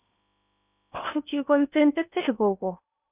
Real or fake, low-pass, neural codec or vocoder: fake; 3.6 kHz; codec, 16 kHz in and 24 kHz out, 0.8 kbps, FocalCodec, streaming, 65536 codes